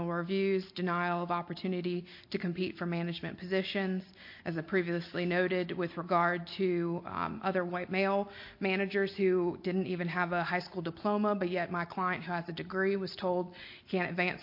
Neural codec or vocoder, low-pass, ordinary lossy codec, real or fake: none; 5.4 kHz; MP3, 32 kbps; real